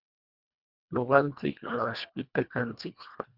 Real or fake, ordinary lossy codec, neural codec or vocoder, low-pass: fake; Opus, 64 kbps; codec, 24 kHz, 1.5 kbps, HILCodec; 5.4 kHz